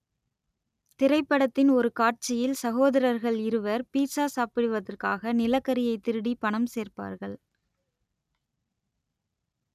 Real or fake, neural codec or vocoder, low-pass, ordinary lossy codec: real; none; 14.4 kHz; none